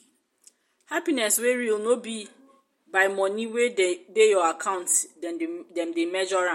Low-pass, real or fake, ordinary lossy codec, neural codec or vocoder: 19.8 kHz; real; MP3, 64 kbps; none